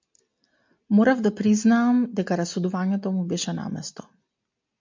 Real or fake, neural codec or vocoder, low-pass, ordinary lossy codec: real; none; 7.2 kHz; MP3, 64 kbps